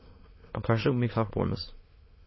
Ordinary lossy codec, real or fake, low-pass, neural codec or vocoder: MP3, 24 kbps; fake; 7.2 kHz; autoencoder, 22.05 kHz, a latent of 192 numbers a frame, VITS, trained on many speakers